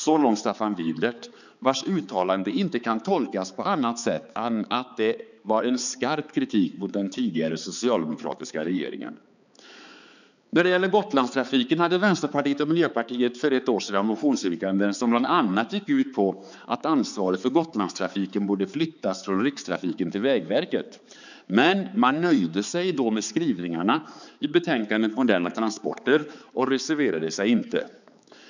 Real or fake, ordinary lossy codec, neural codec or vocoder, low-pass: fake; none; codec, 16 kHz, 4 kbps, X-Codec, HuBERT features, trained on balanced general audio; 7.2 kHz